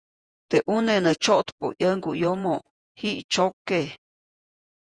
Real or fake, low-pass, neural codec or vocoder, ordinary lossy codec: fake; 9.9 kHz; vocoder, 48 kHz, 128 mel bands, Vocos; Opus, 64 kbps